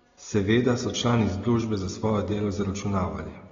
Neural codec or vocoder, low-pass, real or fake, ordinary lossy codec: none; 7.2 kHz; real; AAC, 24 kbps